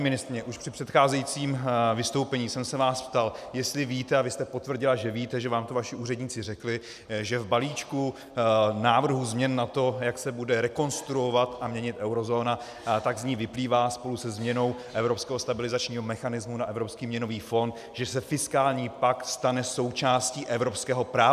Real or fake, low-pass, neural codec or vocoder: real; 14.4 kHz; none